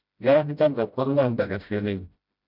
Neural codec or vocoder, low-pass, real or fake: codec, 16 kHz, 0.5 kbps, FreqCodec, smaller model; 5.4 kHz; fake